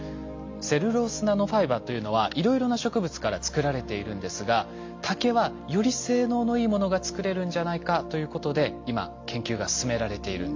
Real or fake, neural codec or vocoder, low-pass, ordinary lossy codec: real; none; 7.2 kHz; MP3, 48 kbps